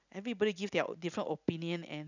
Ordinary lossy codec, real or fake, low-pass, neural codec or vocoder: none; real; 7.2 kHz; none